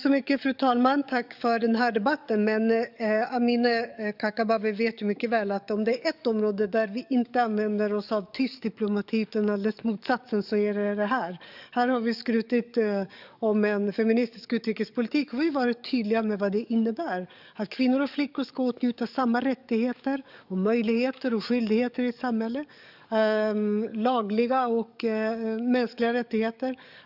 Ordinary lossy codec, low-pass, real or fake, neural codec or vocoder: none; 5.4 kHz; fake; codec, 44.1 kHz, 7.8 kbps, DAC